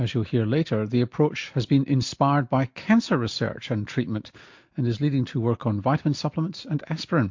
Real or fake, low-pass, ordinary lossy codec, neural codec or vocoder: real; 7.2 kHz; MP3, 48 kbps; none